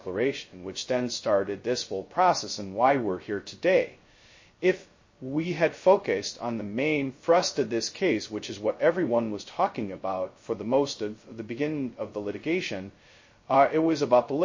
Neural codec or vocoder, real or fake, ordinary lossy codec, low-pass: codec, 16 kHz, 0.2 kbps, FocalCodec; fake; MP3, 32 kbps; 7.2 kHz